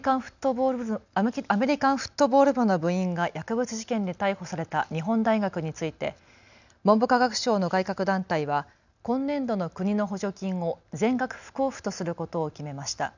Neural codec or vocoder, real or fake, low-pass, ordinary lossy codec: none; real; 7.2 kHz; none